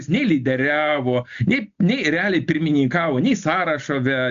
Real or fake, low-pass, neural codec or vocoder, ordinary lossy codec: real; 7.2 kHz; none; AAC, 64 kbps